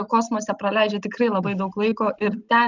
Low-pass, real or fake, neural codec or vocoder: 7.2 kHz; real; none